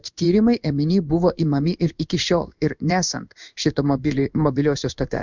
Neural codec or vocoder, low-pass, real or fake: codec, 16 kHz in and 24 kHz out, 1 kbps, XY-Tokenizer; 7.2 kHz; fake